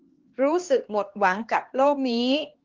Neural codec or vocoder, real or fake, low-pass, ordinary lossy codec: codec, 16 kHz, 2 kbps, X-Codec, HuBERT features, trained on LibriSpeech; fake; 7.2 kHz; Opus, 16 kbps